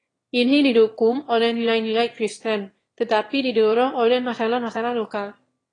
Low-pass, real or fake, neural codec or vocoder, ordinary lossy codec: 9.9 kHz; fake; autoencoder, 22.05 kHz, a latent of 192 numbers a frame, VITS, trained on one speaker; AAC, 32 kbps